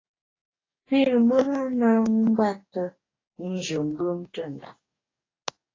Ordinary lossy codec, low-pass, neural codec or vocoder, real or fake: AAC, 32 kbps; 7.2 kHz; codec, 44.1 kHz, 2.6 kbps, DAC; fake